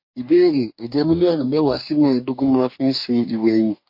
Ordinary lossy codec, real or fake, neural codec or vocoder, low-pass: MP3, 32 kbps; fake; codec, 44.1 kHz, 2.6 kbps, DAC; 5.4 kHz